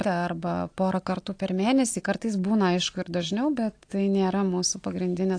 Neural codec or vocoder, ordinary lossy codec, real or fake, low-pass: none; AAC, 48 kbps; real; 9.9 kHz